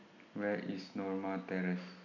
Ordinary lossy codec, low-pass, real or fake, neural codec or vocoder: none; 7.2 kHz; real; none